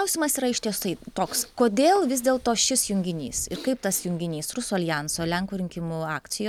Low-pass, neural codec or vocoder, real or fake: 19.8 kHz; none; real